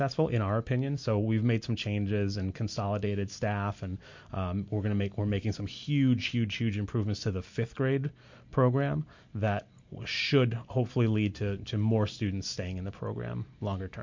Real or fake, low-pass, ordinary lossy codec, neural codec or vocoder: real; 7.2 kHz; MP3, 48 kbps; none